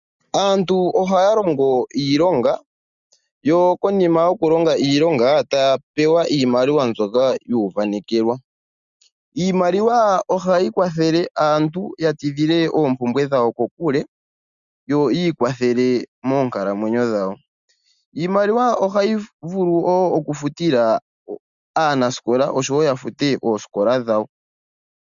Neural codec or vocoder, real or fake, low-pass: none; real; 7.2 kHz